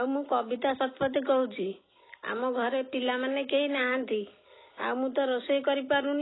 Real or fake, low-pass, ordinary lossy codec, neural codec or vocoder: real; 7.2 kHz; AAC, 16 kbps; none